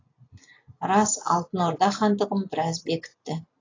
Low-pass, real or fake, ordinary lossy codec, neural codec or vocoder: 7.2 kHz; real; AAC, 32 kbps; none